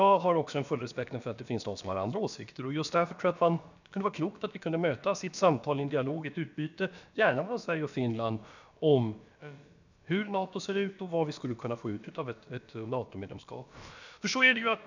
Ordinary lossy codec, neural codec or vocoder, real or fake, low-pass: none; codec, 16 kHz, about 1 kbps, DyCAST, with the encoder's durations; fake; 7.2 kHz